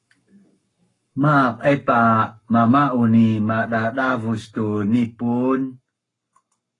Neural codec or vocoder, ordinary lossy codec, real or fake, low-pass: codec, 44.1 kHz, 7.8 kbps, Pupu-Codec; AAC, 32 kbps; fake; 10.8 kHz